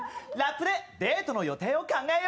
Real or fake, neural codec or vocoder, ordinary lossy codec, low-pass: real; none; none; none